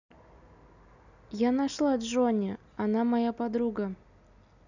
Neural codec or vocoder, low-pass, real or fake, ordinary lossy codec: none; 7.2 kHz; real; none